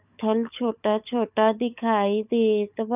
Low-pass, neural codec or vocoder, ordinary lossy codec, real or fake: 3.6 kHz; codec, 16 kHz, 16 kbps, FunCodec, trained on LibriTTS, 50 frames a second; none; fake